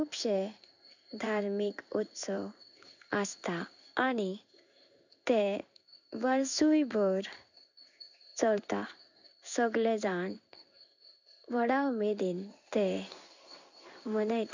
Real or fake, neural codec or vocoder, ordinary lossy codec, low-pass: fake; codec, 16 kHz in and 24 kHz out, 1 kbps, XY-Tokenizer; none; 7.2 kHz